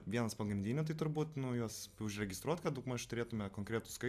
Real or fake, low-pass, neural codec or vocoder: real; 14.4 kHz; none